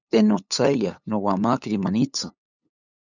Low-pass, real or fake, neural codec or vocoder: 7.2 kHz; fake; codec, 16 kHz, 8 kbps, FunCodec, trained on LibriTTS, 25 frames a second